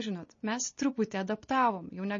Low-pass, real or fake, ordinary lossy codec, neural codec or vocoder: 7.2 kHz; real; MP3, 32 kbps; none